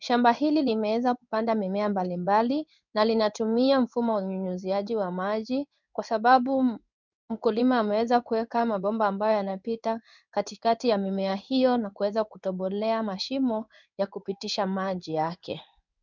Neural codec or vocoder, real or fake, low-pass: codec, 16 kHz in and 24 kHz out, 1 kbps, XY-Tokenizer; fake; 7.2 kHz